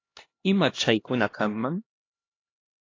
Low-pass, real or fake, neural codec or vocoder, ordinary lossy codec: 7.2 kHz; fake; codec, 16 kHz, 1 kbps, X-Codec, HuBERT features, trained on LibriSpeech; AAC, 32 kbps